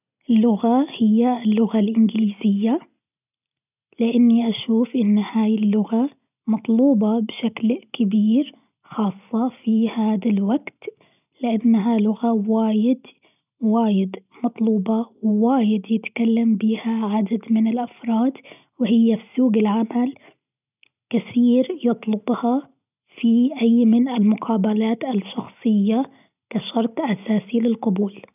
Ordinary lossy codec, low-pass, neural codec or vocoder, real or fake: none; 3.6 kHz; none; real